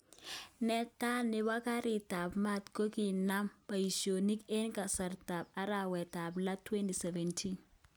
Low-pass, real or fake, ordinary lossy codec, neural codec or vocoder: none; real; none; none